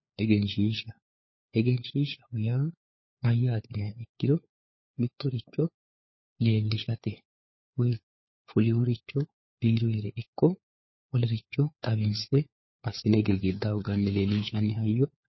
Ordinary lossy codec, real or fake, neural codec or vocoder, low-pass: MP3, 24 kbps; fake; codec, 16 kHz, 4 kbps, FunCodec, trained on LibriTTS, 50 frames a second; 7.2 kHz